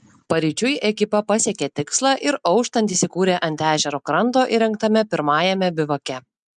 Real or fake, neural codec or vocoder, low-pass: real; none; 10.8 kHz